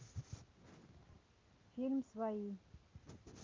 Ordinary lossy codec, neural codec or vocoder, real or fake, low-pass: Opus, 24 kbps; none; real; 7.2 kHz